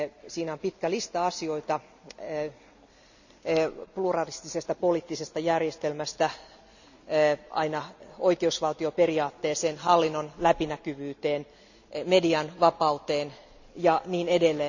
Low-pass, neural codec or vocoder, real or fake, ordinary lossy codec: 7.2 kHz; none; real; none